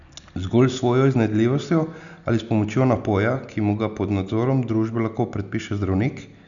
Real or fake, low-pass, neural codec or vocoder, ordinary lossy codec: real; 7.2 kHz; none; none